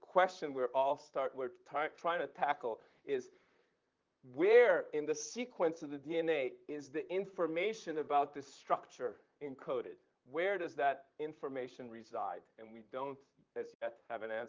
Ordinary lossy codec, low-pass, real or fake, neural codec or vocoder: Opus, 32 kbps; 7.2 kHz; fake; vocoder, 44.1 kHz, 128 mel bands, Pupu-Vocoder